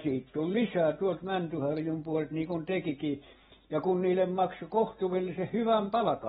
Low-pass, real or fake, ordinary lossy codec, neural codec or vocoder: 7.2 kHz; real; AAC, 16 kbps; none